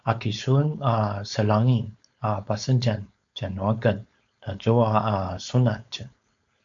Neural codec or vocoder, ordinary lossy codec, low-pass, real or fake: codec, 16 kHz, 4.8 kbps, FACodec; AAC, 64 kbps; 7.2 kHz; fake